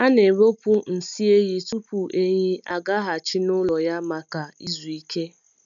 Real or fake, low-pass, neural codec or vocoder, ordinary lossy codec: real; 7.2 kHz; none; none